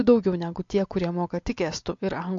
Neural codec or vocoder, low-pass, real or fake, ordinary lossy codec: none; 7.2 kHz; real; MP3, 48 kbps